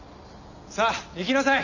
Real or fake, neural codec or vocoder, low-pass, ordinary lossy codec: real; none; 7.2 kHz; none